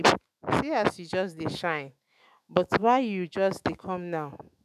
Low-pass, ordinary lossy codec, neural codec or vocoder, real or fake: 14.4 kHz; none; autoencoder, 48 kHz, 128 numbers a frame, DAC-VAE, trained on Japanese speech; fake